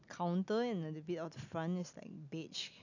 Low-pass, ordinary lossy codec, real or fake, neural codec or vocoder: 7.2 kHz; none; real; none